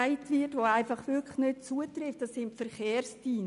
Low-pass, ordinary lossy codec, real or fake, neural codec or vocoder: 10.8 kHz; none; real; none